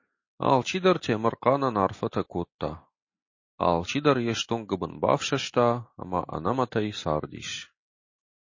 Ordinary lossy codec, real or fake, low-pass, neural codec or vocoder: MP3, 32 kbps; real; 7.2 kHz; none